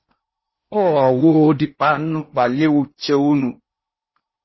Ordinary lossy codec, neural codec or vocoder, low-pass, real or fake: MP3, 24 kbps; codec, 16 kHz in and 24 kHz out, 0.8 kbps, FocalCodec, streaming, 65536 codes; 7.2 kHz; fake